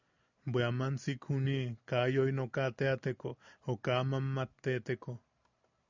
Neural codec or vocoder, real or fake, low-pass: none; real; 7.2 kHz